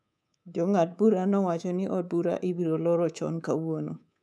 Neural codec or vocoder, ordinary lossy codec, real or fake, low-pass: codec, 24 kHz, 3.1 kbps, DualCodec; none; fake; none